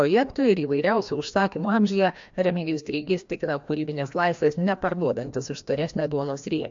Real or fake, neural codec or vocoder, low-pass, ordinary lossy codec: fake; codec, 16 kHz, 1 kbps, FreqCodec, larger model; 7.2 kHz; AAC, 64 kbps